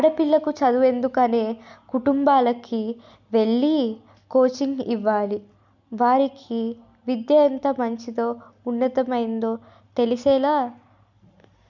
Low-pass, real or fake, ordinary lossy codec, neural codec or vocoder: 7.2 kHz; real; none; none